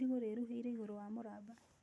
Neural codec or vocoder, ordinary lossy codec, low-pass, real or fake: none; none; none; real